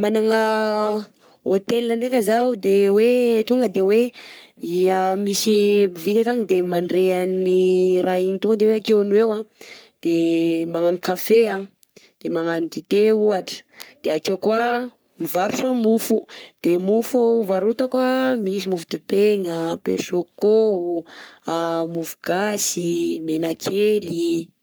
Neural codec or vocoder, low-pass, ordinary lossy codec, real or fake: codec, 44.1 kHz, 3.4 kbps, Pupu-Codec; none; none; fake